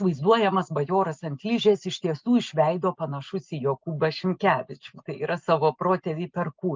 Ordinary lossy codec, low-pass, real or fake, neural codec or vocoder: Opus, 32 kbps; 7.2 kHz; real; none